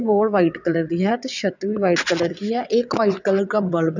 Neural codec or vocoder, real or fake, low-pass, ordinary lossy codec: vocoder, 22.05 kHz, 80 mel bands, WaveNeXt; fake; 7.2 kHz; none